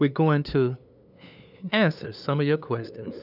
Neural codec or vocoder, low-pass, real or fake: codec, 16 kHz, 2 kbps, FunCodec, trained on LibriTTS, 25 frames a second; 5.4 kHz; fake